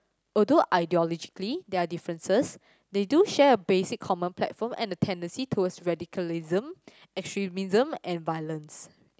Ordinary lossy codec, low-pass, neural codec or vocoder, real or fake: none; none; none; real